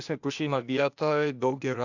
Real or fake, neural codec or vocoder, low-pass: fake; codec, 16 kHz, 0.8 kbps, ZipCodec; 7.2 kHz